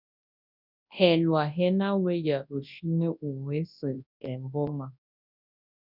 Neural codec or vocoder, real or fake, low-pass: codec, 24 kHz, 0.9 kbps, WavTokenizer, large speech release; fake; 5.4 kHz